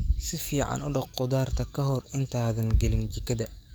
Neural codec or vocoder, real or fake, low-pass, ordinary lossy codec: codec, 44.1 kHz, 7.8 kbps, DAC; fake; none; none